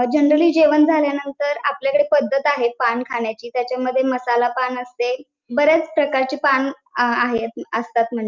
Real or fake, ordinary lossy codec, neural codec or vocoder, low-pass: real; Opus, 24 kbps; none; 7.2 kHz